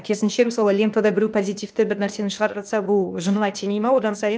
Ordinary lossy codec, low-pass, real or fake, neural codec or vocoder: none; none; fake; codec, 16 kHz, 0.8 kbps, ZipCodec